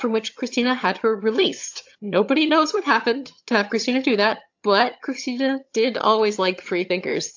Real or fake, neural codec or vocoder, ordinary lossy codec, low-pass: fake; vocoder, 22.05 kHz, 80 mel bands, HiFi-GAN; AAC, 48 kbps; 7.2 kHz